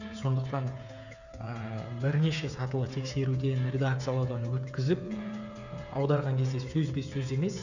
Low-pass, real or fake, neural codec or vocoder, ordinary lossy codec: 7.2 kHz; fake; codec, 16 kHz, 8 kbps, FreqCodec, smaller model; none